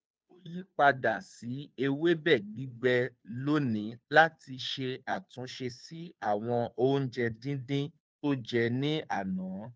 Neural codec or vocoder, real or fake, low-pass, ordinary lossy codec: codec, 16 kHz, 2 kbps, FunCodec, trained on Chinese and English, 25 frames a second; fake; none; none